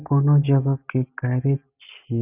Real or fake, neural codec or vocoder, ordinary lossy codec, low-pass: real; none; none; 3.6 kHz